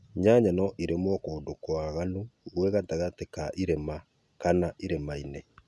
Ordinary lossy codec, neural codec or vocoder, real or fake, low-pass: none; none; real; none